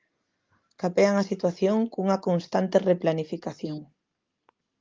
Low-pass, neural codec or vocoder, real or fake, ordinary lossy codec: 7.2 kHz; none; real; Opus, 32 kbps